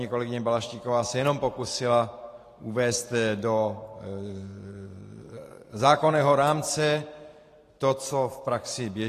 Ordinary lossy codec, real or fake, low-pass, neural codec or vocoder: AAC, 48 kbps; fake; 14.4 kHz; vocoder, 44.1 kHz, 128 mel bands every 512 samples, BigVGAN v2